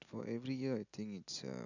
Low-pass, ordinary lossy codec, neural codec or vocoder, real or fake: 7.2 kHz; none; none; real